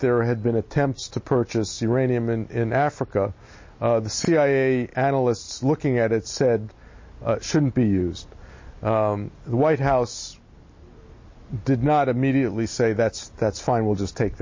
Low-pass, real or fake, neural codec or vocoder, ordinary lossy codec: 7.2 kHz; real; none; MP3, 32 kbps